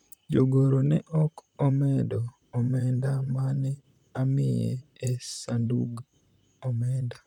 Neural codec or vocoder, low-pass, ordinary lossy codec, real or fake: vocoder, 44.1 kHz, 128 mel bands, Pupu-Vocoder; 19.8 kHz; none; fake